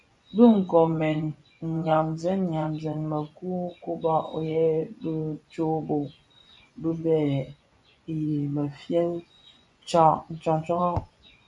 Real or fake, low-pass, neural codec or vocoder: fake; 10.8 kHz; vocoder, 24 kHz, 100 mel bands, Vocos